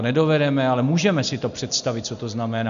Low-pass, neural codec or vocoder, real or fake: 7.2 kHz; none; real